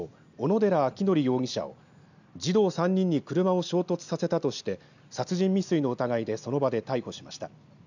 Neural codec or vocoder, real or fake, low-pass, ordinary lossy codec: none; real; 7.2 kHz; none